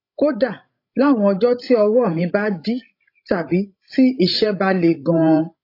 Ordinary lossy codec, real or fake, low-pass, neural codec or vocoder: AAC, 32 kbps; fake; 5.4 kHz; codec, 16 kHz, 16 kbps, FreqCodec, larger model